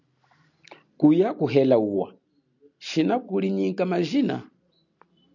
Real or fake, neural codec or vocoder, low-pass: real; none; 7.2 kHz